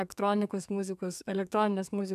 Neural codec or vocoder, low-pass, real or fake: codec, 32 kHz, 1.9 kbps, SNAC; 14.4 kHz; fake